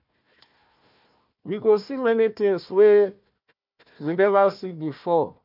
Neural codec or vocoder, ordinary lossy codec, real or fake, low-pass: codec, 16 kHz, 1 kbps, FunCodec, trained on Chinese and English, 50 frames a second; none; fake; 5.4 kHz